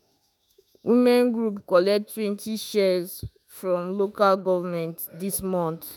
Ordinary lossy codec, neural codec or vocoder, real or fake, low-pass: none; autoencoder, 48 kHz, 32 numbers a frame, DAC-VAE, trained on Japanese speech; fake; none